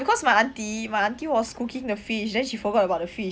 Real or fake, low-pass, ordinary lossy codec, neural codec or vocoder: real; none; none; none